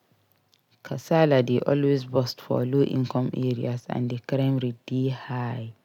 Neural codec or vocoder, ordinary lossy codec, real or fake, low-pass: none; none; real; 19.8 kHz